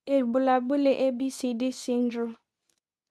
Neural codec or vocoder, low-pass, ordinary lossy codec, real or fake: codec, 24 kHz, 0.9 kbps, WavTokenizer, medium speech release version 2; none; none; fake